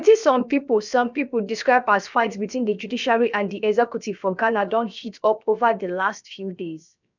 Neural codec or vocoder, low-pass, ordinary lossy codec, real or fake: codec, 16 kHz, about 1 kbps, DyCAST, with the encoder's durations; 7.2 kHz; none; fake